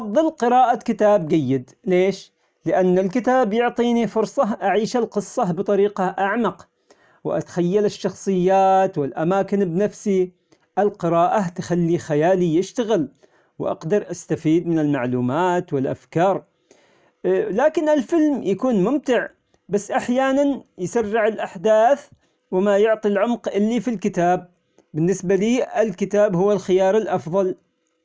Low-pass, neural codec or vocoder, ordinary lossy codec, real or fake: none; none; none; real